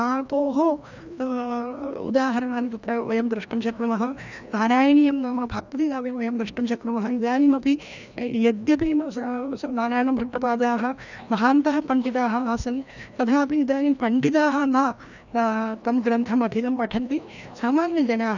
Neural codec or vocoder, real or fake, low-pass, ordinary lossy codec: codec, 16 kHz, 1 kbps, FreqCodec, larger model; fake; 7.2 kHz; none